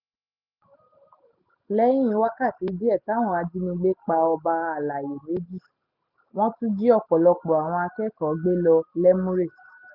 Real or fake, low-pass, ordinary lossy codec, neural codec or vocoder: real; 5.4 kHz; none; none